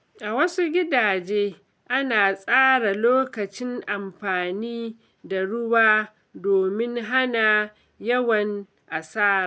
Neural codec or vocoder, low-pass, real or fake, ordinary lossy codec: none; none; real; none